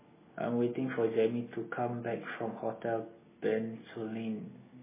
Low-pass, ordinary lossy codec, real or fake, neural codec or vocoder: 3.6 kHz; MP3, 16 kbps; real; none